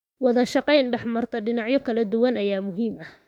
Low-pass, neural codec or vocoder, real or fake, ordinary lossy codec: 19.8 kHz; autoencoder, 48 kHz, 32 numbers a frame, DAC-VAE, trained on Japanese speech; fake; MP3, 96 kbps